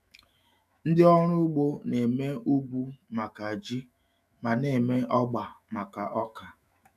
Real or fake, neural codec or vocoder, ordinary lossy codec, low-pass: fake; autoencoder, 48 kHz, 128 numbers a frame, DAC-VAE, trained on Japanese speech; none; 14.4 kHz